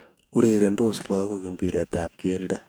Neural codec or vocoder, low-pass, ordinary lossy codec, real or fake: codec, 44.1 kHz, 2.6 kbps, DAC; none; none; fake